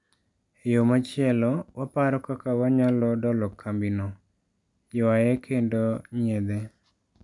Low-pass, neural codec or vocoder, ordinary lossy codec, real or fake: 10.8 kHz; none; MP3, 96 kbps; real